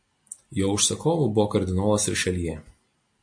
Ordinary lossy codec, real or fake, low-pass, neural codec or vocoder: MP3, 48 kbps; real; 9.9 kHz; none